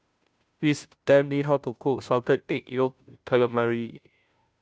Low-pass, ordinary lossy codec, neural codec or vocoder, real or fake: none; none; codec, 16 kHz, 0.5 kbps, FunCodec, trained on Chinese and English, 25 frames a second; fake